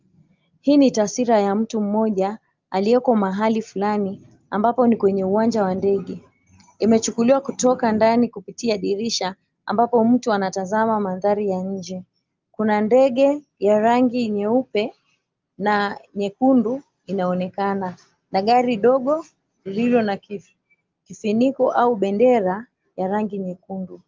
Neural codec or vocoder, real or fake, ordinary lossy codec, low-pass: none; real; Opus, 32 kbps; 7.2 kHz